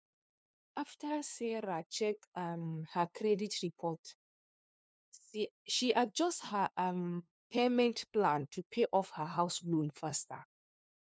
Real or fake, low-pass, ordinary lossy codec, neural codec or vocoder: fake; none; none; codec, 16 kHz, 2 kbps, FunCodec, trained on LibriTTS, 25 frames a second